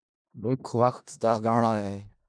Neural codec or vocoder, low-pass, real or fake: codec, 16 kHz in and 24 kHz out, 0.4 kbps, LongCat-Audio-Codec, four codebook decoder; 9.9 kHz; fake